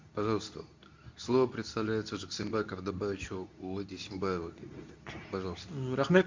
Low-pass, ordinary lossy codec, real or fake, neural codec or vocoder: 7.2 kHz; MP3, 64 kbps; fake; codec, 24 kHz, 0.9 kbps, WavTokenizer, medium speech release version 2